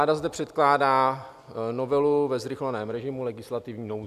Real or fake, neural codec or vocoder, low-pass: real; none; 14.4 kHz